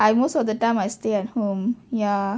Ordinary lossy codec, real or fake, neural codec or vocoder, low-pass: none; real; none; none